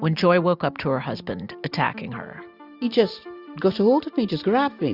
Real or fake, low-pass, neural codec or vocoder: real; 5.4 kHz; none